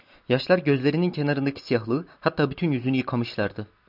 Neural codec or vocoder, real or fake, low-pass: none; real; 5.4 kHz